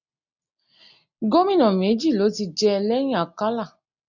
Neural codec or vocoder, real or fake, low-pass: none; real; 7.2 kHz